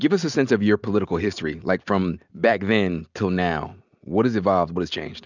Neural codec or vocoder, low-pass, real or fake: none; 7.2 kHz; real